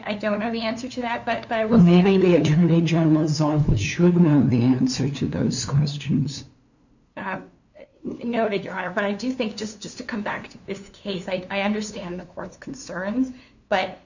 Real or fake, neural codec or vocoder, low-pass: fake; codec, 16 kHz, 2 kbps, FunCodec, trained on LibriTTS, 25 frames a second; 7.2 kHz